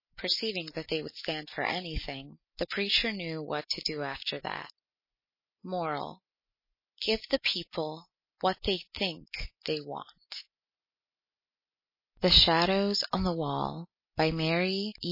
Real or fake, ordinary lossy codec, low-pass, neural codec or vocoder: real; MP3, 24 kbps; 5.4 kHz; none